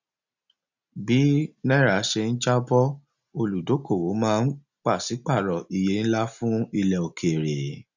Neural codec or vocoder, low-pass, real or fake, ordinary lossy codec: none; 7.2 kHz; real; none